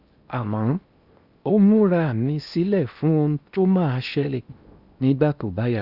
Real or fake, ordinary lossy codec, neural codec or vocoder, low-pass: fake; Opus, 64 kbps; codec, 16 kHz in and 24 kHz out, 0.8 kbps, FocalCodec, streaming, 65536 codes; 5.4 kHz